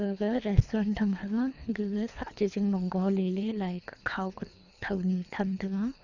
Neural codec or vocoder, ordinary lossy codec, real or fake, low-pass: codec, 24 kHz, 3 kbps, HILCodec; none; fake; 7.2 kHz